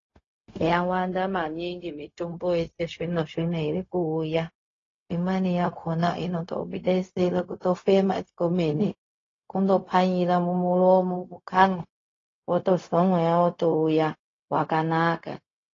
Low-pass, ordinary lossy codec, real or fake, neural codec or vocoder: 7.2 kHz; AAC, 32 kbps; fake; codec, 16 kHz, 0.4 kbps, LongCat-Audio-Codec